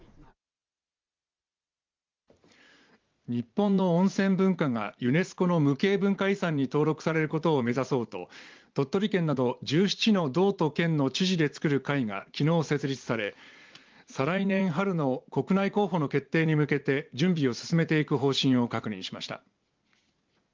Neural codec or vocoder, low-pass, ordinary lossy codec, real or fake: vocoder, 44.1 kHz, 80 mel bands, Vocos; 7.2 kHz; Opus, 32 kbps; fake